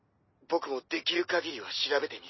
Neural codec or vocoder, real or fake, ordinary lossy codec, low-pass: none; real; MP3, 24 kbps; 7.2 kHz